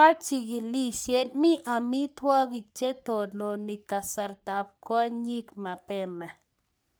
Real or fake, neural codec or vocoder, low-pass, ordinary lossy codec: fake; codec, 44.1 kHz, 3.4 kbps, Pupu-Codec; none; none